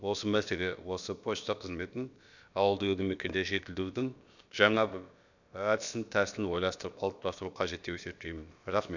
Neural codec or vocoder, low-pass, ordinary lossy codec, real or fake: codec, 16 kHz, about 1 kbps, DyCAST, with the encoder's durations; 7.2 kHz; none; fake